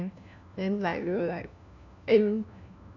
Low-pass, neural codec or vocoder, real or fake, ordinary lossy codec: 7.2 kHz; codec, 16 kHz, 1 kbps, FunCodec, trained on LibriTTS, 50 frames a second; fake; none